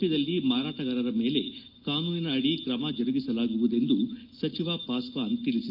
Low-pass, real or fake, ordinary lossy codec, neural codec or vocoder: 5.4 kHz; real; Opus, 24 kbps; none